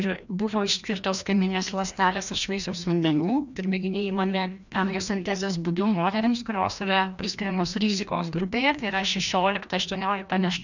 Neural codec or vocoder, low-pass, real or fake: codec, 16 kHz, 1 kbps, FreqCodec, larger model; 7.2 kHz; fake